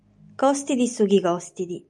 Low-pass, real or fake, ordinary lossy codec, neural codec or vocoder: 10.8 kHz; real; MP3, 96 kbps; none